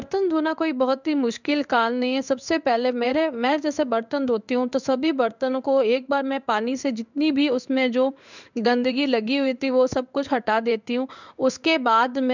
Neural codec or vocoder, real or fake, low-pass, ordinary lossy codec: codec, 16 kHz in and 24 kHz out, 1 kbps, XY-Tokenizer; fake; 7.2 kHz; none